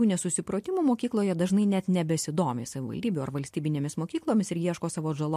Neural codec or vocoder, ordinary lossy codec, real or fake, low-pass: none; MP3, 64 kbps; real; 14.4 kHz